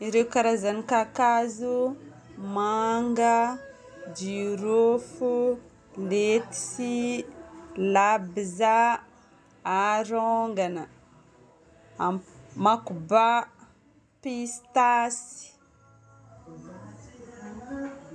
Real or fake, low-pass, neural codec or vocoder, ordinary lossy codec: real; none; none; none